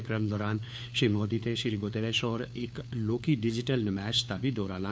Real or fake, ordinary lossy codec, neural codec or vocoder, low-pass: fake; none; codec, 16 kHz, 4 kbps, FunCodec, trained on LibriTTS, 50 frames a second; none